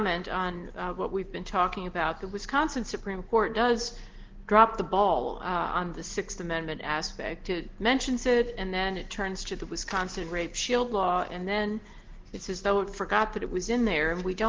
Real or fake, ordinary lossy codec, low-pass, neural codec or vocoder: real; Opus, 16 kbps; 7.2 kHz; none